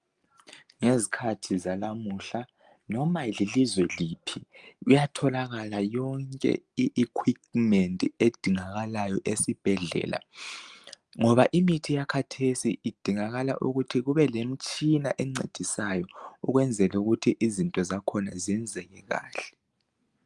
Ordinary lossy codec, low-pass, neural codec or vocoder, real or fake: Opus, 32 kbps; 10.8 kHz; none; real